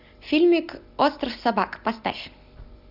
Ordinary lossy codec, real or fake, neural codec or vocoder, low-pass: Opus, 64 kbps; real; none; 5.4 kHz